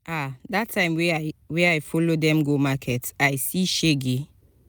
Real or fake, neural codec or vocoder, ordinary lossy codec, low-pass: real; none; none; none